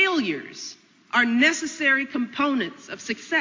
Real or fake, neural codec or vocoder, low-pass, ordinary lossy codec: real; none; 7.2 kHz; MP3, 48 kbps